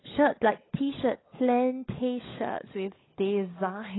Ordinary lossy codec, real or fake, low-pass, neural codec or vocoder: AAC, 16 kbps; fake; 7.2 kHz; codec, 16 kHz, 16 kbps, FunCodec, trained on LibriTTS, 50 frames a second